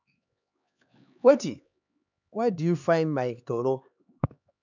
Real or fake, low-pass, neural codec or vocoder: fake; 7.2 kHz; codec, 16 kHz, 4 kbps, X-Codec, HuBERT features, trained on LibriSpeech